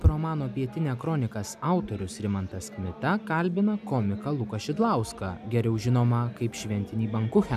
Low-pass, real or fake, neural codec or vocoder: 14.4 kHz; real; none